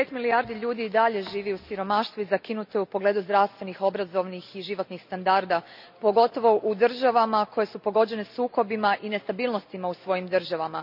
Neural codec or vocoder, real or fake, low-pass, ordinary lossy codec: none; real; 5.4 kHz; none